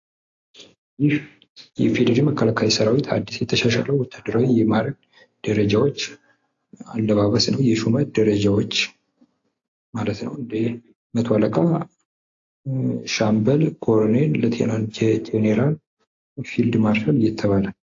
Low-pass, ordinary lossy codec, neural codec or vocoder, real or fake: 7.2 kHz; AAC, 48 kbps; none; real